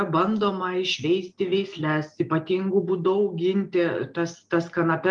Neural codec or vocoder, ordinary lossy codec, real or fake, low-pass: none; Opus, 32 kbps; real; 9.9 kHz